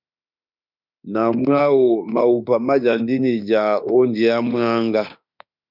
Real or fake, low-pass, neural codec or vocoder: fake; 5.4 kHz; autoencoder, 48 kHz, 32 numbers a frame, DAC-VAE, trained on Japanese speech